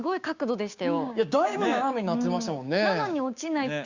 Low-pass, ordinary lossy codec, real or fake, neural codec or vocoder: 7.2 kHz; Opus, 64 kbps; fake; codec, 16 kHz, 6 kbps, DAC